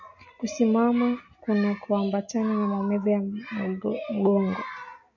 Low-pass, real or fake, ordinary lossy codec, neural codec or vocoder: 7.2 kHz; real; MP3, 64 kbps; none